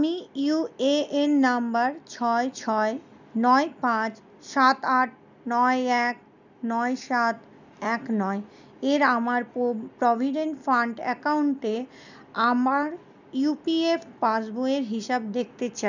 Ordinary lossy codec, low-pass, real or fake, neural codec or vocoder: none; 7.2 kHz; real; none